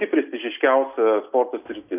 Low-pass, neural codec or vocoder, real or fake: 3.6 kHz; none; real